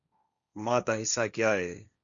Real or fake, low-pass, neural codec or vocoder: fake; 7.2 kHz; codec, 16 kHz, 1.1 kbps, Voila-Tokenizer